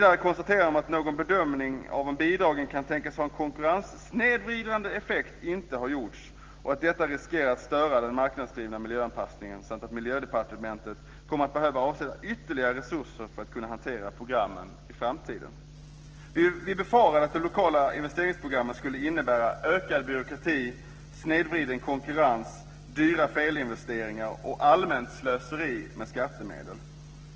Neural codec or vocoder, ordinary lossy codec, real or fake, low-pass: none; Opus, 16 kbps; real; 7.2 kHz